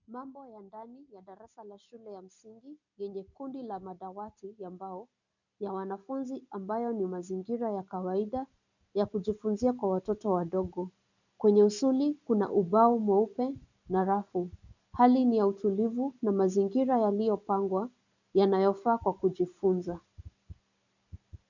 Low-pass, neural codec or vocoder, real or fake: 7.2 kHz; none; real